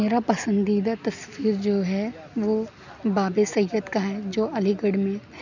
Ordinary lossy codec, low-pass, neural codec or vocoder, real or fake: none; 7.2 kHz; none; real